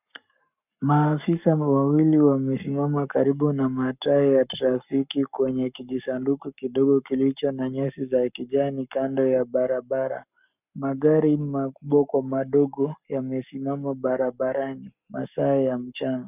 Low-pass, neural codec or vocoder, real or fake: 3.6 kHz; codec, 44.1 kHz, 7.8 kbps, Pupu-Codec; fake